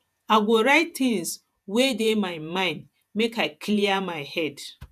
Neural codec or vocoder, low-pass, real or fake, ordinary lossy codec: vocoder, 48 kHz, 128 mel bands, Vocos; 14.4 kHz; fake; none